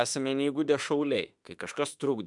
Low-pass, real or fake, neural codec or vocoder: 10.8 kHz; fake; autoencoder, 48 kHz, 32 numbers a frame, DAC-VAE, trained on Japanese speech